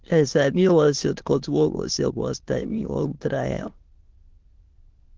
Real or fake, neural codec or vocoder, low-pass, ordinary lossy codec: fake; autoencoder, 22.05 kHz, a latent of 192 numbers a frame, VITS, trained on many speakers; 7.2 kHz; Opus, 16 kbps